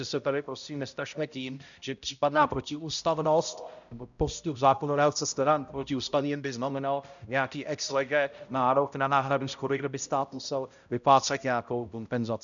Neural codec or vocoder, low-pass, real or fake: codec, 16 kHz, 0.5 kbps, X-Codec, HuBERT features, trained on balanced general audio; 7.2 kHz; fake